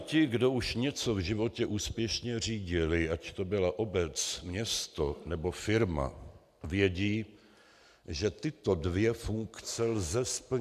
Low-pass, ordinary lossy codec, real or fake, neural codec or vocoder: 14.4 kHz; AAC, 96 kbps; real; none